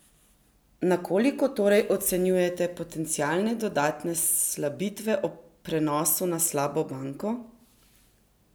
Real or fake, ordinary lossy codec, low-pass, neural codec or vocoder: real; none; none; none